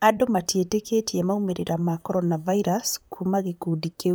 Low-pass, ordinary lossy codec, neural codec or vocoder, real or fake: none; none; vocoder, 44.1 kHz, 128 mel bands, Pupu-Vocoder; fake